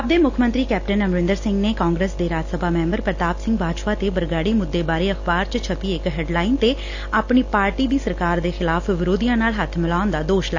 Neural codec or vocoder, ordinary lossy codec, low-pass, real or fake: none; AAC, 48 kbps; 7.2 kHz; real